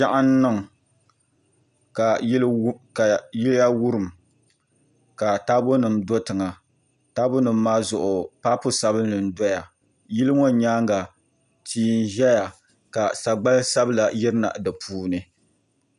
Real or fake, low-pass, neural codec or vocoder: real; 10.8 kHz; none